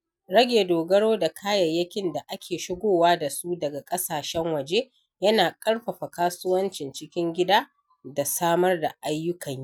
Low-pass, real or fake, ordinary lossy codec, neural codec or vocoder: 19.8 kHz; real; none; none